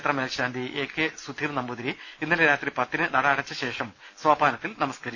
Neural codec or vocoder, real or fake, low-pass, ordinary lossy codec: none; real; 7.2 kHz; none